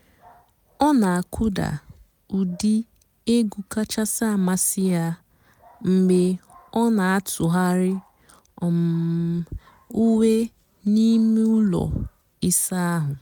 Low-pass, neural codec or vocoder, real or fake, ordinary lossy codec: none; none; real; none